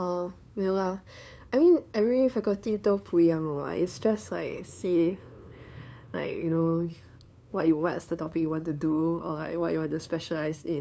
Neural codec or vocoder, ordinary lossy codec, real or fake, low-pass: codec, 16 kHz, 2 kbps, FunCodec, trained on LibriTTS, 25 frames a second; none; fake; none